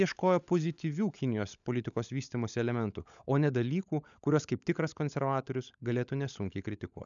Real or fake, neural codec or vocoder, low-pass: fake; codec, 16 kHz, 16 kbps, FunCodec, trained on LibriTTS, 50 frames a second; 7.2 kHz